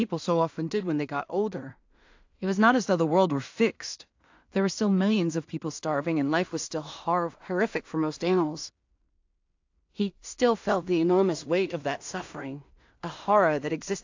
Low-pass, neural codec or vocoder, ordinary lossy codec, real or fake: 7.2 kHz; codec, 16 kHz in and 24 kHz out, 0.4 kbps, LongCat-Audio-Codec, two codebook decoder; AAC, 48 kbps; fake